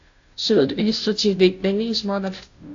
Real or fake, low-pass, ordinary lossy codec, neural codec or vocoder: fake; 7.2 kHz; AAC, 48 kbps; codec, 16 kHz, 0.5 kbps, FunCodec, trained on Chinese and English, 25 frames a second